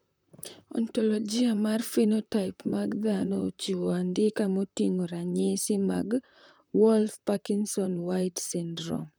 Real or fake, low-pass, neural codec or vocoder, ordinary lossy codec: fake; none; vocoder, 44.1 kHz, 128 mel bands, Pupu-Vocoder; none